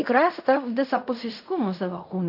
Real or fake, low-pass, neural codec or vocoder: fake; 5.4 kHz; codec, 16 kHz in and 24 kHz out, 0.4 kbps, LongCat-Audio-Codec, fine tuned four codebook decoder